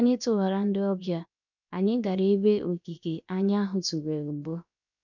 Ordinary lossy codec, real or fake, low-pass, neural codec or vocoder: none; fake; 7.2 kHz; codec, 16 kHz, 0.7 kbps, FocalCodec